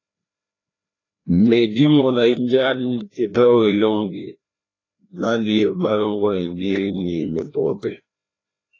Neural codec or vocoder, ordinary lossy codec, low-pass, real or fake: codec, 16 kHz, 1 kbps, FreqCodec, larger model; AAC, 32 kbps; 7.2 kHz; fake